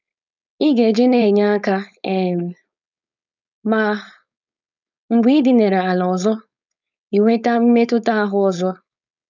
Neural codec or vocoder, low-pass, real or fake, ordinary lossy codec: codec, 16 kHz, 4.8 kbps, FACodec; 7.2 kHz; fake; none